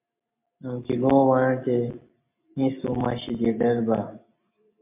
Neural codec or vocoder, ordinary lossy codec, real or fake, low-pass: none; MP3, 32 kbps; real; 3.6 kHz